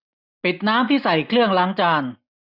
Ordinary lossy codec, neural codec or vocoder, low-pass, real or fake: none; none; 5.4 kHz; real